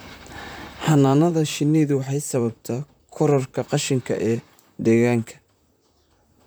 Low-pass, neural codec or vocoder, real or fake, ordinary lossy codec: none; vocoder, 44.1 kHz, 128 mel bands, Pupu-Vocoder; fake; none